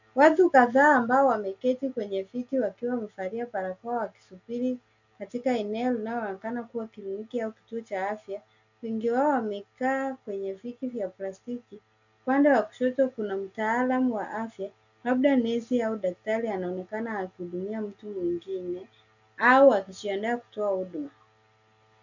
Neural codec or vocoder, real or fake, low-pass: none; real; 7.2 kHz